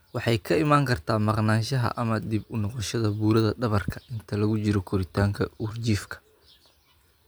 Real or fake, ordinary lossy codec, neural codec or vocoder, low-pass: real; none; none; none